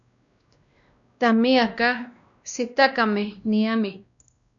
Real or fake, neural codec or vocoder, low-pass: fake; codec, 16 kHz, 1 kbps, X-Codec, WavLM features, trained on Multilingual LibriSpeech; 7.2 kHz